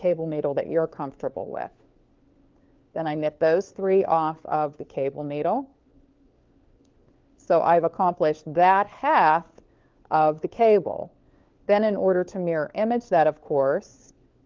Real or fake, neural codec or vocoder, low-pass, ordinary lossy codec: fake; codec, 16 kHz, 4 kbps, FunCodec, trained on LibriTTS, 50 frames a second; 7.2 kHz; Opus, 24 kbps